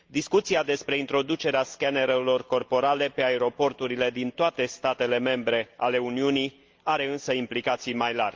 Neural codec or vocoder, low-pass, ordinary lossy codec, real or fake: none; 7.2 kHz; Opus, 24 kbps; real